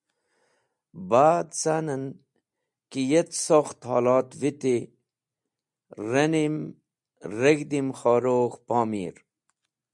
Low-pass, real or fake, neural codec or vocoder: 10.8 kHz; real; none